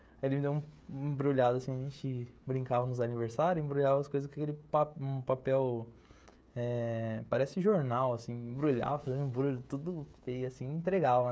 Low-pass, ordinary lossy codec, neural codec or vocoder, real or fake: none; none; codec, 16 kHz, 16 kbps, FreqCodec, smaller model; fake